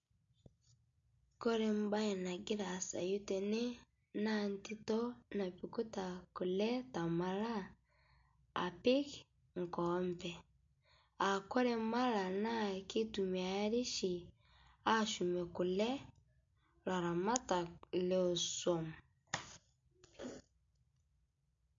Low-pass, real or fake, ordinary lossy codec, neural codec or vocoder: 7.2 kHz; real; MP3, 48 kbps; none